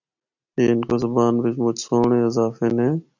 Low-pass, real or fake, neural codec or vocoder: 7.2 kHz; real; none